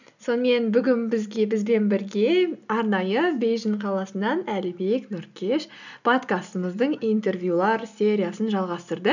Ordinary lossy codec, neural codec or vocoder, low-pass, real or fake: none; none; 7.2 kHz; real